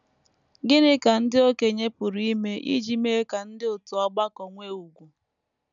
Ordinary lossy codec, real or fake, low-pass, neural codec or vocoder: none; real; 7.2 kHz; none